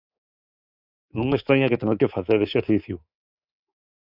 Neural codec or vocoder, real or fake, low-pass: codec, 24 kHz, 3.1 kbps, DualCodec; fake; 5.4 kHz